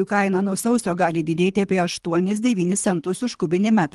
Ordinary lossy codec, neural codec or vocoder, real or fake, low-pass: Opus, 24 kbps; codec, 24 kHz, 3 kbps, HILCodec; fake; 10.8 kHz